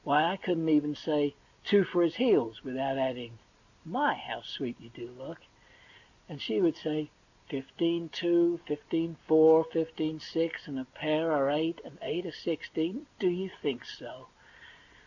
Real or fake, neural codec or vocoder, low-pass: real; none; 7.2 kHz